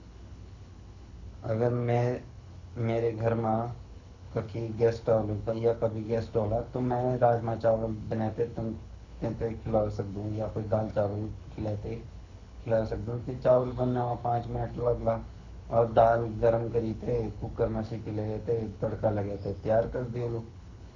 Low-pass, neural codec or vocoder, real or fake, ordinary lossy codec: 7.2 kHz; codec, 24 kHz, 6 kbps, HILCodec; fake; none